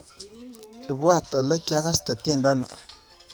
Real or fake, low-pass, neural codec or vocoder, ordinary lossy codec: fake; none; codec, 44.1 kHz, 2.6 kbps, SNAC; none